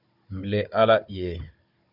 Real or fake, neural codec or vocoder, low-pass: fake; codec, 16 kHz, 16 kbps, FunCodec, trained on Chinese and English, 50 frames a second; 5.4 kHz